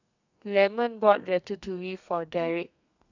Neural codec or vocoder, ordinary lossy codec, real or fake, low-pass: codec, 32 kHz, 1.9 kbps, SNAC; none; fake; 7.2 kHz